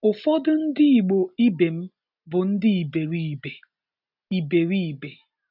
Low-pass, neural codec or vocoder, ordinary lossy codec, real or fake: 5.4 kHz; none; none; real